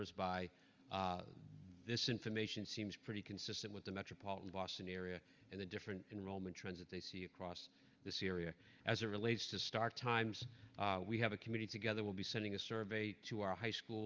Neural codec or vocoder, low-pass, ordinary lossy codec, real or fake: none; 7.2 kHz; Opus, 32 kbps; real